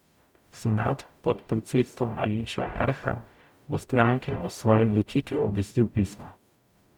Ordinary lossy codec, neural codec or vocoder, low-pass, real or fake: none; codec, 44.1 kHz, 0.9 kbps, DAC; 19.8 kHz; fake